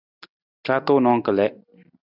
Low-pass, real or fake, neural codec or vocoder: 5.4 kHz; real; none